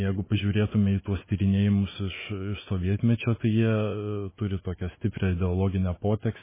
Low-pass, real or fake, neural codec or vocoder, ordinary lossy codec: 3.6 kHz; fake; autoencoder, 48 kHz, 128 numbers a frame, DAC-VAE, trained on Japanese speech; MP3, 16 kbps